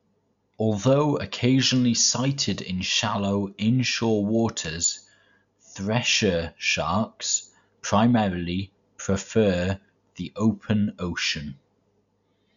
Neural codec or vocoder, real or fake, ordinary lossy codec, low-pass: none; real; none; 7.2 kHz